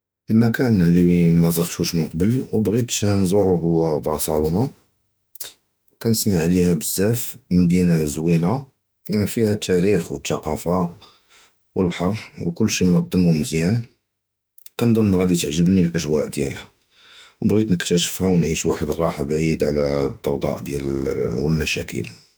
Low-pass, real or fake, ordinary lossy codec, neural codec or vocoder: none; fake; none; autoencoder, 48 kHz, 32 numbers a frame, DAC-VAE, trained on Japanese speech